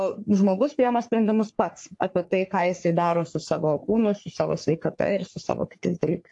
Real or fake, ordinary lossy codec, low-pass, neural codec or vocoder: fake; AAC, 64 kbps; 10.8 kHz; codec, 44.1 kHz, 3.4 kbps, Pupu-Codec